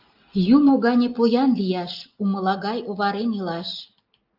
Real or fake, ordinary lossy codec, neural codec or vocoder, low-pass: real; Opus, 24 kbps; none; 5.4 kHz